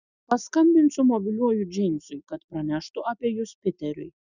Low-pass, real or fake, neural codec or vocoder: 7.2 kHz; real; none